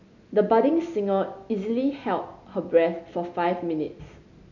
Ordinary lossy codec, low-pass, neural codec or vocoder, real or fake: none; 7.2 kHz; none; real